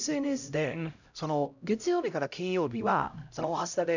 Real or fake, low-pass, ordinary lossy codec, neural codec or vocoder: fake; 7.2 kHz; none; codec, 16 kHz, 0.5 kbps, X-Codec, HuBERT features, trained on LibriSpeech